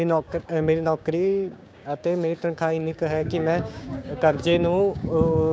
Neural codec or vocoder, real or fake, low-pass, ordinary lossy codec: codec, 16 kHz, 6 kbps, DAC; fake; none; none